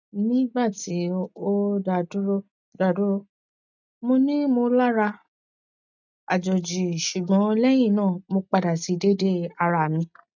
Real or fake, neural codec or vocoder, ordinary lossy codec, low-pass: real; none; none; 7.2 kHz